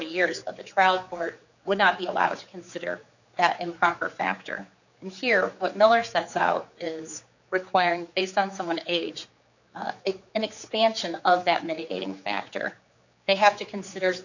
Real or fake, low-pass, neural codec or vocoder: fake; 7.2 kHz; codec, 16 kHz, 4 kbps, X-Codec, HuBERT features, trained on general audio